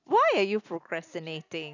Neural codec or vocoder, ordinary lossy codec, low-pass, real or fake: none; none; 7.2 kHz; real